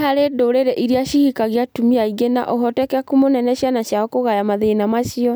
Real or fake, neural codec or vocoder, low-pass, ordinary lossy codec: real; none; none; none